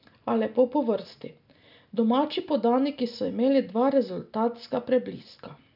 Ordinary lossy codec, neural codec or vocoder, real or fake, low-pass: none; none; real; 5.4 kHz